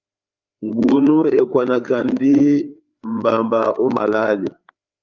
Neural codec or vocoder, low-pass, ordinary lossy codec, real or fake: codec, 16 kHz, 4 kbps, FreqCodec, larger model; 7.2 kHz; Opus, 24 kbps; fake